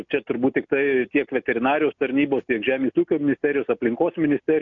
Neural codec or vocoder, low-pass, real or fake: none; 7.2 kHz; real